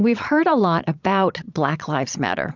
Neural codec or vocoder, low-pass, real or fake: vocoder, 22.05 kHz, 80 mel bands, Vocos; 7.2 kHz; fake